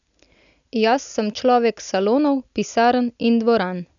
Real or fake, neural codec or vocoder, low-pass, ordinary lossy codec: real; none; 7.2 kHz; none